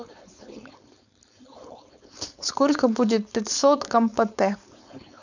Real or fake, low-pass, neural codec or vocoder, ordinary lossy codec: fake; 7.2 kHz; codec, 16 kHz, 4.8 kbps, FACodec; none